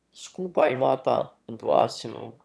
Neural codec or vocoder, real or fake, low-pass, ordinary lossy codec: autoencoder, 22.05 kHz, a latent of 192 numbers a frame, VITS, trained on one speaker; fake; none; none